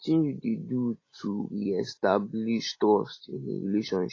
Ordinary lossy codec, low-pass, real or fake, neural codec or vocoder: AAC, 32 kbps; 7.2 kHz; real; none